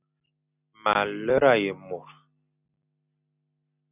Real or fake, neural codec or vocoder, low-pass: real; none; 3.6 kHz